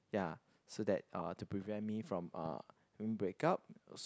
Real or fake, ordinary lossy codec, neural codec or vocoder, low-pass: real; none; none; none